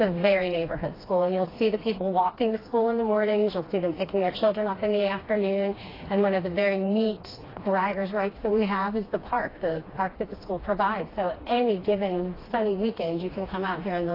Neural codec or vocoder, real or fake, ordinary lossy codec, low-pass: codec, 16 kHz, 2 kbps, FreqCodec, smaller model; fake; AAC, 24 kbps; 5.4 kHz